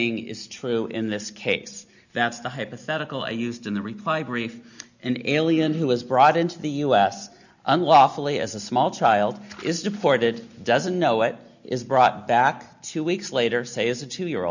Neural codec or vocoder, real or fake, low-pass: none; real; 7.2 kHz